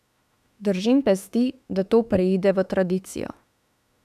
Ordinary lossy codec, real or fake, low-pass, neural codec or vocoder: none; fake; 14.4 kHz; autoencoder, 48 kHz, 32 numbers a frame, DAC-VAE, trained on Japanese speech